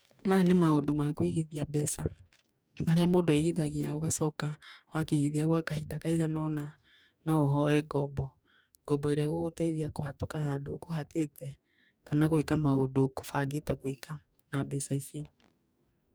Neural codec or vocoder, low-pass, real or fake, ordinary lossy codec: codec, 44.1 kHz, 2.6 kbps, DAC; none; fake; none